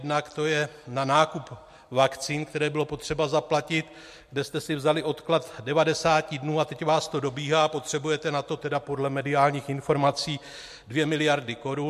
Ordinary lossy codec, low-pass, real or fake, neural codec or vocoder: MP3, 64 kbps; 14.4 kHz; real; none